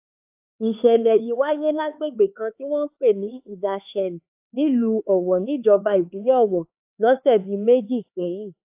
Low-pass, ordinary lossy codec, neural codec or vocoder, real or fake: 3.6 kHz; AAC, 32 kbps; codec, 16 kHz, 4 kbps, X-Codec, HuBERT features, trained on LibriSpeech; fake